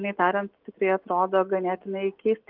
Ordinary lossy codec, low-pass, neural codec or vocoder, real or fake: Opus, 24 kbps; 5.4 kHz; none; real